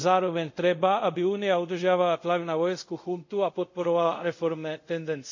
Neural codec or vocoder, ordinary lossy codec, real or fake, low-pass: codec, 24 kHz, 0.5 kbps, DualCodec; MP3, 64 kbps; fake; 7.2 kHz